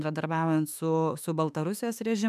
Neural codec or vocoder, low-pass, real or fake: autoencoder, 48 kHz, 32 numbers a frame, DAC-VAE, trained on Japanese speech; 14.4 kHz; fake